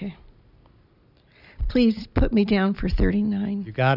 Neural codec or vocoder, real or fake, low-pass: none; real; 5.4 kHz